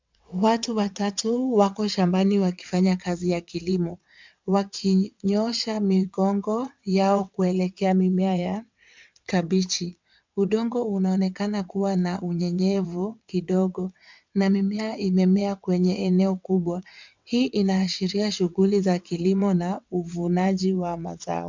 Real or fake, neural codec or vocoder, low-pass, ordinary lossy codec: fake; vocoder, 22.05 kHz, 80 mel bands, WaveNeXt; 7.2 kHz; MP3, 64 kbps